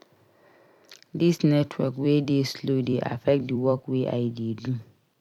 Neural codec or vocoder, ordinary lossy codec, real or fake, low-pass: vocoder, 44.1 kHz, 128 mel bands every 256 samples, BigVGAN v2; none; fake; 19.8 kHz